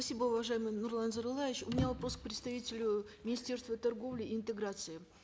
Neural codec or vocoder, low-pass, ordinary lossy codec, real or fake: none; none; none; real